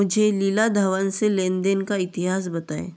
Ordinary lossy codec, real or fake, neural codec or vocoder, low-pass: none; real; none; none